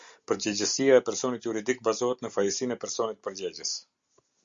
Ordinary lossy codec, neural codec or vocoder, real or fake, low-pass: Opus, 64 kbps; none; real; 7.2 kHz